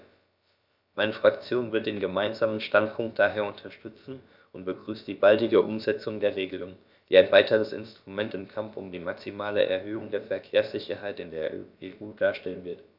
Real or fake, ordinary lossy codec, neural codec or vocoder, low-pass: fake; none; codec, 16 kHz, about 1 kbps, DyCAST, with the encoder's durations; 5.4 kHz